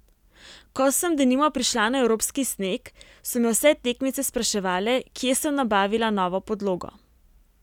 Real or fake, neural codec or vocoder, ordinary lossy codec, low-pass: real; none; none; 19.8 kHz